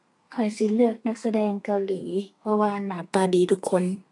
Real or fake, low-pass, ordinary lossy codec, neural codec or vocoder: fake; 10.8 kHz; AAC, 64 kbps; codec, 32 kHz, 1.9 kbps, SNAC